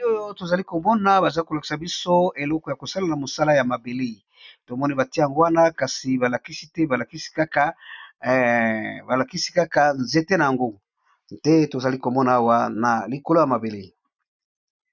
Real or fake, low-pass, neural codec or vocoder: real; 7.2 kHz; none